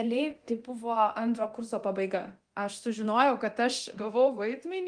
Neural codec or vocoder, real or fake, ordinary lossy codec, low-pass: codec, 24 kHz, 0.9 kbps, DualCodec; fake; Opus, 24 kbps; 9.9 kHz